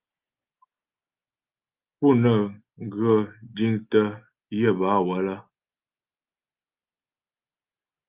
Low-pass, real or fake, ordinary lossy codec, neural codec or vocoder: 3.6 kHz; real; Opus, 32 kbps; none